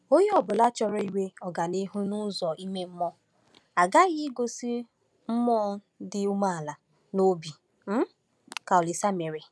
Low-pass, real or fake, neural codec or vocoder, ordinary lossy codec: none; real; none; none